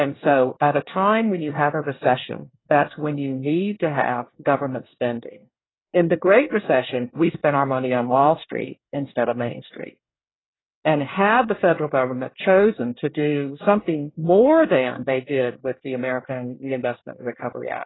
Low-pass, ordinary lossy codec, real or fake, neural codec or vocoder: 7.2 kHz; AAC, 16 kbps; fake; codec, 24 kHz, 1 kbps, SNAC